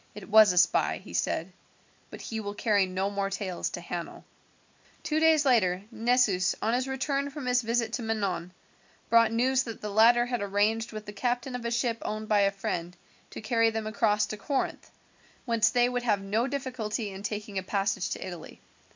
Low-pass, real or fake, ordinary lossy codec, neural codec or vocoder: 7.2 kHz; real; MP3, 64 kbps; none